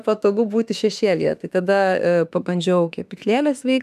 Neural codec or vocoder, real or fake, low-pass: autoencoder, 48 kHz, 32 numbers a frame, DAC-VAE, trained on Japanese speech; fake; 14.4 kHz